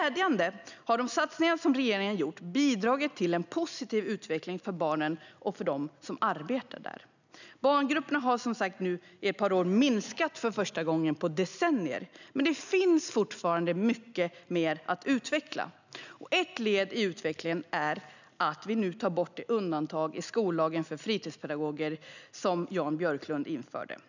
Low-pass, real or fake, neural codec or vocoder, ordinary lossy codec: 7.2 kHz; real; none; none